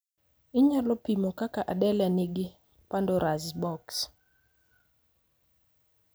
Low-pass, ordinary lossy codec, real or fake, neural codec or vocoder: none; none; real; none